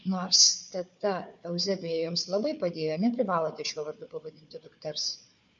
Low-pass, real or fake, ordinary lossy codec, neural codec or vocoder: 7.2 kHz; fake; MP3, 48 kbps; codec, 16 kHz, 4 kbps, FunCodec, trained on Chinese and English, 50 frames a second